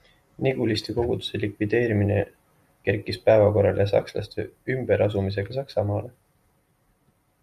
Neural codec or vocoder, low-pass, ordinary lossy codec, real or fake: none; 14.4 kHz; MP3, 96 kbps; real